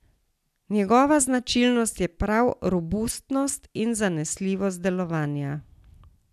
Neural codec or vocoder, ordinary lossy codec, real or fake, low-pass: none; none; real; 14.4 kHz